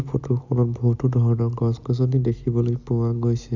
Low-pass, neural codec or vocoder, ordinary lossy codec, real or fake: 7.2 kHz; none; none; real